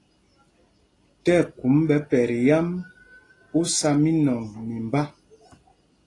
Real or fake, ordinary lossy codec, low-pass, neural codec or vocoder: real; AAC, 32 kbps; 10.8 kHz; none